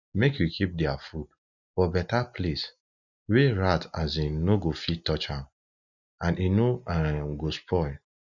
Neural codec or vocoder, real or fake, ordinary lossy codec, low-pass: none; real; none; 7.2 kHz